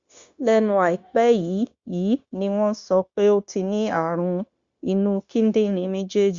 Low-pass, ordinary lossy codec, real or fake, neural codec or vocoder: 7.2 kHz; Opus, 64 kbps; fake; codec, 16 kHz, 0.9 kbps, LongCat-Audio-Codec